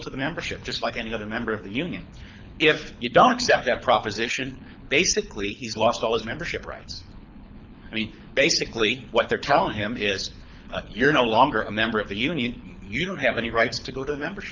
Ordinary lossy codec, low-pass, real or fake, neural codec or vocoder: MP3, 64 kbps; 7.2 kHz; fake; codec, 24 kHz, 6 kbps, HILCodec